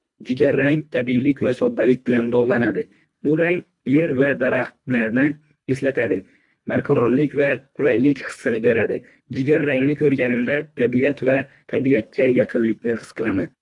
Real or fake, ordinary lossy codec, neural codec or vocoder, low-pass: fake; AAC, 64 kbps; codec, 24 kHz, 1.5 kbps, HILCodec; 10.8 kHz